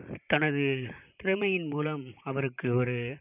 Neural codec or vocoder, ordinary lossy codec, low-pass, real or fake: vocoder, 44.1 kHz, 128 mel bands, Pupu-Vocoder; none; 3.6 kHz; fake